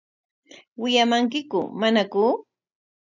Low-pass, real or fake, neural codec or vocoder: 7.2 kHz; real; none